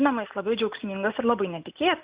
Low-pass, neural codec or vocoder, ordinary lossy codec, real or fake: 3.6 kHz; none; Opus, 64 kbps; real